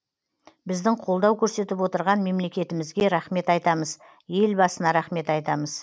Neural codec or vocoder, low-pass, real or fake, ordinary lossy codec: none; none; real; none